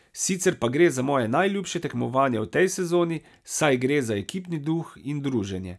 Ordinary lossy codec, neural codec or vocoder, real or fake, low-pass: none; none; real; none